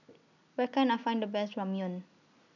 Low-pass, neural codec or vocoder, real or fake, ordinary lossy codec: 7.2 kHz; none; real; none